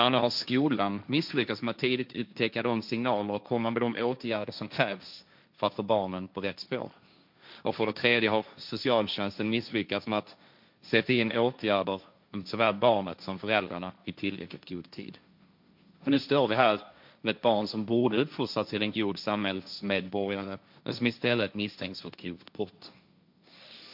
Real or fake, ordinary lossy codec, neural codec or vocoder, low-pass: fake; MP3, 48 kbps; codec, 16 kHz, 1.1 kbps, Voila-Tokenizer; 5.4 kHz